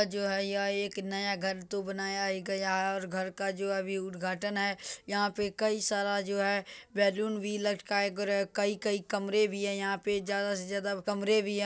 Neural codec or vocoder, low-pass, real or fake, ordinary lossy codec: none; none; real; none